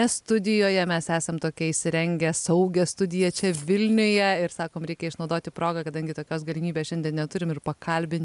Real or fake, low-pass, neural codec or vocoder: real; 10.8 kHz; none